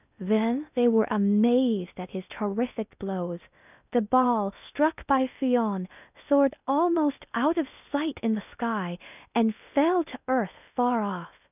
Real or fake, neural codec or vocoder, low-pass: fake; codec, 16 kHz in and 24 kHz out, 0.6 kbps, FocalCodec, streaming, 2048 codes; 3.6 kHz